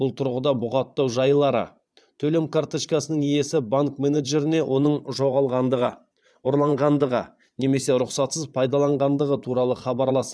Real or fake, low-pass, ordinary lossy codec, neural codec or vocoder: fake; none; none; vocoder, 22.05 kHz, 80 mel bands, Vocos